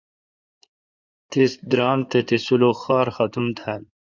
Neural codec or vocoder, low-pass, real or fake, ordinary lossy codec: codec, 16 kHz in and 24 kHz out, 2.2 kbps, FireRedTTS-2 codec; 7.2 kHz; fake; Opus, 64 kbps